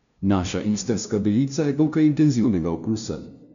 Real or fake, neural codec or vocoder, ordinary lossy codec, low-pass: fake; codec, 16 kHz, 0.5 kbps, FunCodec, trained on LibriTTS, 25 frames a second; none; 7.2 kHz